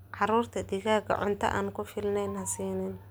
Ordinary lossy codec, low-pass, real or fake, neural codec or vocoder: none; none; real; none